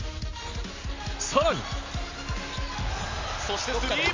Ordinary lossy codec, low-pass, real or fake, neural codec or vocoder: MP3, 48 kbps; 7.2 kHz; real; none